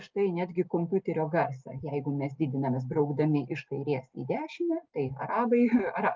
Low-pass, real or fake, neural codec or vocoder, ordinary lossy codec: 7.2 kHz; real; none; Opus, 32 kbps